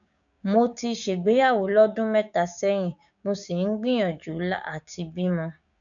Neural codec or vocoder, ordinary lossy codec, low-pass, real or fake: codec, 16 kHz, 6 kbps, DAC; none; 7.2 kHz; fake